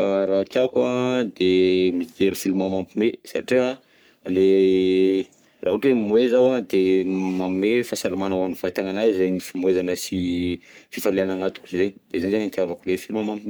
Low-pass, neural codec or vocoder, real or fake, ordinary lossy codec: none; codec, 44.1 kHz, 3.4 kbps, Pupu-Codec; fake; none